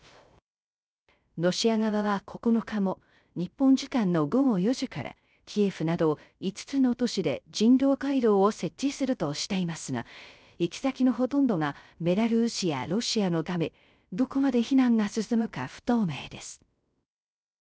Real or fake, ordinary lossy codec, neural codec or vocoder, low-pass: fake; none; codec, 16 kHz, 0.3 kbps, FocalCodec; none